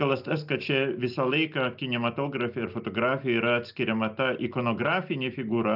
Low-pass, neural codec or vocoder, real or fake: 5.4 kHz; none; real